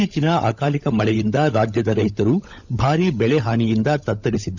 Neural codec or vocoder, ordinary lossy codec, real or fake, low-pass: codec, 16 kHz, 16 kbps, FunCodec, trained on LibriTTS, 50 frames a second; none; fake; 7.2 kHz